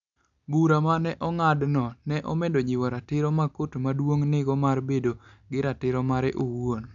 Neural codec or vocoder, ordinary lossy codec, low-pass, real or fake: none; none; 7.2 kHz; real